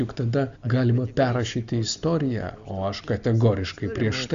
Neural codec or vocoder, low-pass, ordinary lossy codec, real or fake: none; 7.2 kHz; Opus, 64 kbps; real